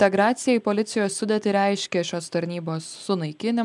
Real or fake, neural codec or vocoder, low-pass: real; none; 10.8 kHz